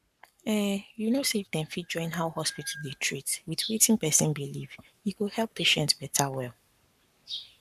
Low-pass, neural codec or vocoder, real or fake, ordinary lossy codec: 14.4 kHz; codec, 44.1 kHz, 7.8 kbps, Pupu-Codec; fake; none